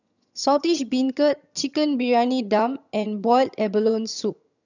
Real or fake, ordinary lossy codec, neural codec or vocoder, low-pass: fake; none; vocoder, 22.05 kHz, 80 mel bands, HiFi-GAN; 7.2 kHz